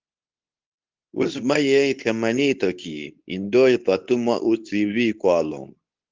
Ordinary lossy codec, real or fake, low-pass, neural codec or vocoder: Opus, 24 kbps; fake; 7.2 kHz; codec, 24 kHz, 0.9 kbps, WavTokenizer, medium speech release version 2